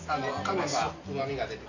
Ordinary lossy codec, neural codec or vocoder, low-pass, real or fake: none; none; 7.2 kHz; real